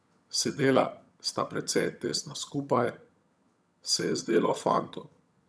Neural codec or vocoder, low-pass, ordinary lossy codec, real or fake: vocoder, 22.05 kHz, 80 mel bands, HiFi-GAN; none; none; fake